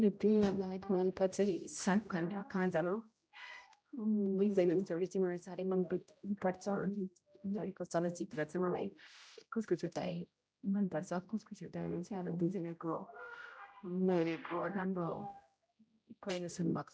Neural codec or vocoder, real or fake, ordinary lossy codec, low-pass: codec, 16 kHz, 0.5 kbps, X-Codec, HuBERT features, trained on general audio; fake; none; none